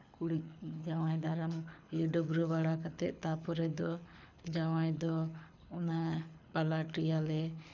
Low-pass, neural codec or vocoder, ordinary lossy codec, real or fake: 7.2 kHz; codec, 24 kHz, 6 kbps, HILCodec; none; fake